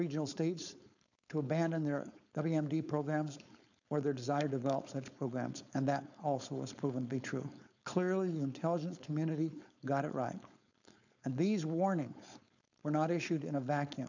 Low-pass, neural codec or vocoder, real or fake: 7.2 kHz; codec, 16 kHz, 4.8 kbps, FACodec; fake